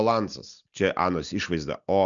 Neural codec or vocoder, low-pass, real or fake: none; 7.2 kHz; real